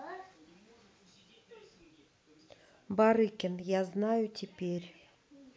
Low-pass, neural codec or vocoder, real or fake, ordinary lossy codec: none; none; real; none